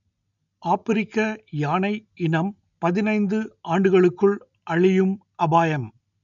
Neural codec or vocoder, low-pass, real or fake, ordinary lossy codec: none; 7.2 kHz; real; none